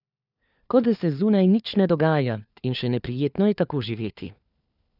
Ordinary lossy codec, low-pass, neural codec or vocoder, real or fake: none; 5.4 kHz; codec, 16 kHz, 4 kbps, FunCodec, trained on LibriTTS, 50 frames a second; fake